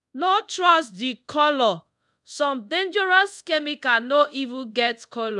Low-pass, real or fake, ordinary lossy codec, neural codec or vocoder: 10.8 kHz; fake; none; codec, 24 kHz, 0.5 kbps, DualCodec